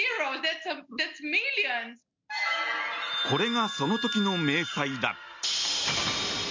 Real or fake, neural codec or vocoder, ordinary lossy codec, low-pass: real; none; MP3, 64 kbps; 7.2 kHz